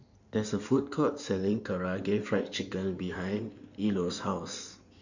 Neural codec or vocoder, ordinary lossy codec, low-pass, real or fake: codec, 16 kHz in and 24 kHz out, 2.2 kbps, FireRedTTS-2 codec; none; 7.2 kHz; fake